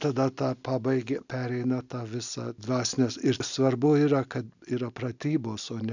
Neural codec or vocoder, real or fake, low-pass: none; real; 7.2 kHz